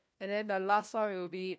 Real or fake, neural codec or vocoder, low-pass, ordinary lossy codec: fake; codec, 16 kHz, 1 kbps, FunCodec, trained on LibriTTS, 50 frames a second; none; none